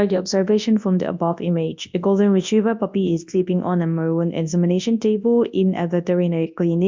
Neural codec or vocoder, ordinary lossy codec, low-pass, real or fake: codec, 24 kHz, 0.9 kbps, WavTokenizer, large speech release; MP3, 64 kbps; 7.2 kHz; fake